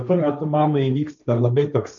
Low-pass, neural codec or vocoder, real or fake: 7.2 kHz; codec, 16 kHz, 1.1 kbps, Voila-Tokenizer; fake